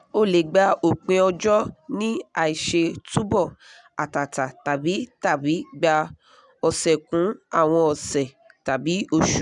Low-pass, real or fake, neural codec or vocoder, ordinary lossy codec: 10.8 kHz; real; none; none